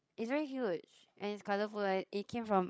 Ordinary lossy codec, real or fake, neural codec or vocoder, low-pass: none; fake; codec, 16 kHz, 16 kbps, FreqCodec, larger model; none